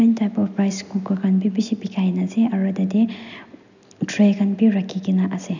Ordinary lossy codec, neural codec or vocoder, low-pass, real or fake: none; none; 7.2 kHz; real